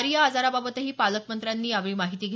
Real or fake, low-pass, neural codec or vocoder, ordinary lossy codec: real; none; none; none